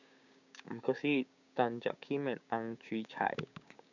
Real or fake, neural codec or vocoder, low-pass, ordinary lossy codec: fake; codec, 16 kHz, 6 kbps, DAC; 7.2 kHz; none